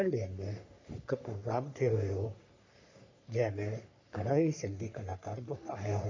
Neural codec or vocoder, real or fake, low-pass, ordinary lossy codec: codec, 44.1 kHz, 3.4 kbps, Pupu-Codec; fake; 7.2 kHz; MP3, 48 kbps